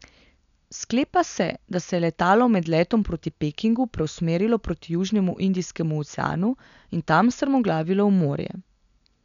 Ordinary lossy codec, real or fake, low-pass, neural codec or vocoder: none; real; 7.2 kHz; none